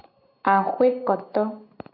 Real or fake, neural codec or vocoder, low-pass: real; none; 5.4 kHz